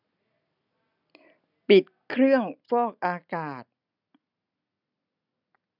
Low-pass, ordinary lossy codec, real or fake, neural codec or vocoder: 5.4 kHz; none; real; none